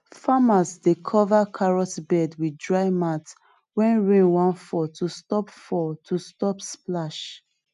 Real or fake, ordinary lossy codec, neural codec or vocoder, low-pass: real; none; none; 10.8 kHz